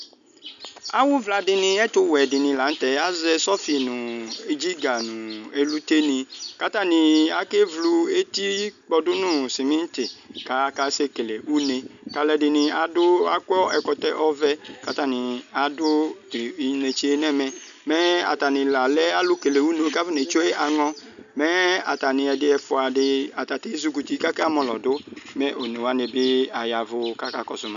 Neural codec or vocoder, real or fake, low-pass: none; real; 7.2 kHz